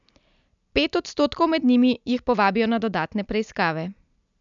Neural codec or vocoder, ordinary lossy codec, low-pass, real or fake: none; MP3, 96 kbps; 7.2 kHz; real